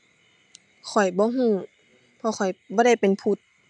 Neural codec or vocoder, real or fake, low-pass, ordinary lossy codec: none; real; 10.8 kHz; none